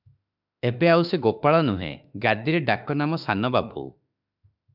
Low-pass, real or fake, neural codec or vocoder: 5.4 kHz; fake; autoencoder, 48 kHz, 32 numbers a frame, DAC-VAE, trained on Japanese speech